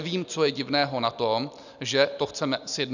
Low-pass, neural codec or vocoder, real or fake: 7.2 kHz; none; real